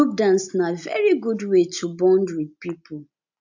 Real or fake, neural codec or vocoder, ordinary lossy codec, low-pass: real; none; MP3, 64 kbps; 7.2 kHz